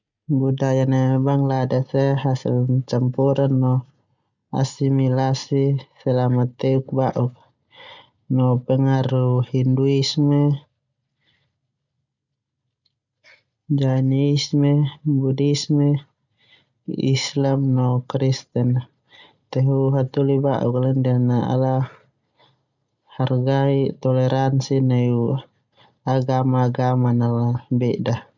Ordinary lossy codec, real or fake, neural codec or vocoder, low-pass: none; real; none; 7.2 kHz